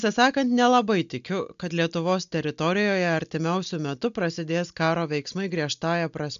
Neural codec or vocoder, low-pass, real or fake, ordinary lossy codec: none; 7.2 kHz; real; AAC, 96 kbps